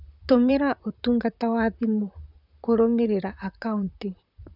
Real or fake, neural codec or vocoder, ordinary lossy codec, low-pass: fake; vocoder, 44.1 kHz, 128 mel bands, Pupu-Vocoder; none; 5.4 kHz